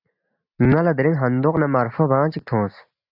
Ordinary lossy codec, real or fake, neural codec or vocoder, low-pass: AAC, 48 kbps; real; none; 5.4 kHz